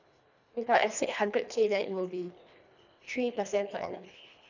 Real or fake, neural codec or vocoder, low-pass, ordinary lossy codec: fake; codec, 24 kHz, 1.5 kbps, HILCodec; 7.2 kHz; none